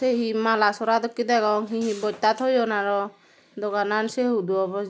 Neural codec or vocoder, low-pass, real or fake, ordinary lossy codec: none; none; real; none